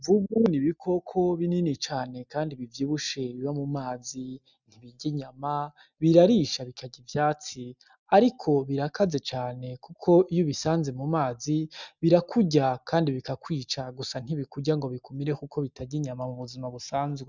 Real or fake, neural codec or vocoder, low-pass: real; none; 7.2 kHz